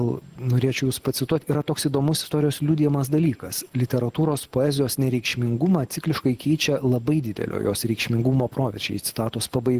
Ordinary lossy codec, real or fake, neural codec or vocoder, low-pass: Opus, 16 kbps; real; none; 14.4 kHz